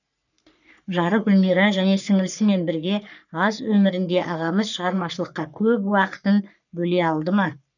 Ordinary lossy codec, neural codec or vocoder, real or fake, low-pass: none; codec, 44.1 kHz, 3.4 kbps, Pupu-Codec; fake; 7.2 kHz